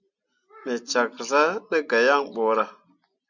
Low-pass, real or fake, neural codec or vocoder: 7.2 kHz; real; none